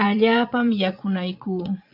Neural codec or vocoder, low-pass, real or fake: vocoder, 22.05 kHz, 80 mel bands, Vocos; 5.4 kHz; fake